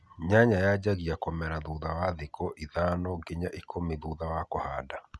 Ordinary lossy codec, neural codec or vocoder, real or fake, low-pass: none; none; real; none